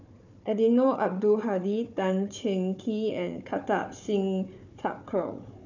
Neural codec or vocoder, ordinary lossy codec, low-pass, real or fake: codec, 16 kHz, 4 kbps, FunCodec, trained on Chinese and English, 50 frames a second; none; 7.2 kHz; fake